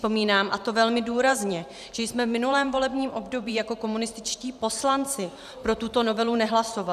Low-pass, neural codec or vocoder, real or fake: 14.4 kHz; vocoder, 44.1 kHz, 128 mel bands every 512 samples, BigVGAN v2; fake